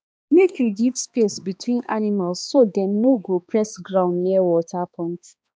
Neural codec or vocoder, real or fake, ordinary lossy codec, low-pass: codec, 16 kHz, 2 kbps, X-Codec, HuBERT features, trained on balanced general audio; fake; none; none